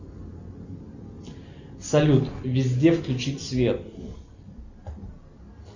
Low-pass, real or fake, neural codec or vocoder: 7.2 kHz; real; none